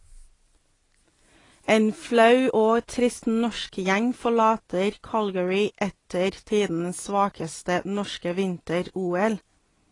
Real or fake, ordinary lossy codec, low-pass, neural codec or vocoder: real; AAC, 32 kbps; 10.8 kHz; none